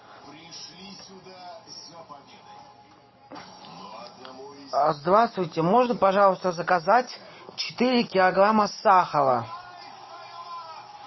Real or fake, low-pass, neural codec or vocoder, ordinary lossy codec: real; 7.2 kHz; none; MP3, 24 kbps